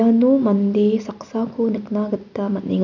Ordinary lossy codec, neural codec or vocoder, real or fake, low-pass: none; none; real; 7.2 kHz